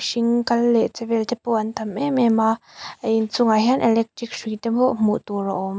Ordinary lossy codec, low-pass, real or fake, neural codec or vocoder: none; none; real; none